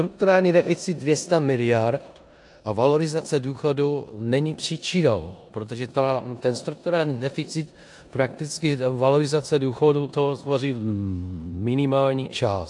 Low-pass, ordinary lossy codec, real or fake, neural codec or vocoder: 10.8 kHz; AAC, 64 kbps; fake; codec, 16 kHz in and 24 kHz out, 0.9 kbps, LongCat-Audio-Codec, four codebook decoder